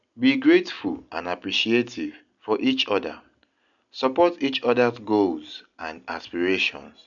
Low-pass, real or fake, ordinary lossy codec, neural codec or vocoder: 7.2 kHz; real; none; none